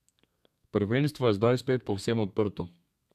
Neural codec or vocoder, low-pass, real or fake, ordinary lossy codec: codec, 32 kHz, 1.9 kbps, SNAC; 14.4 kHz; fake; none